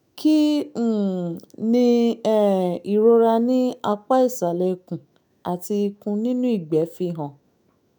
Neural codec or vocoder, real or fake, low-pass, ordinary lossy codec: autoencoder, 48 kHz, 128 numbers a frame, DAC-VAE, trained on Japanese speech; fake; none; none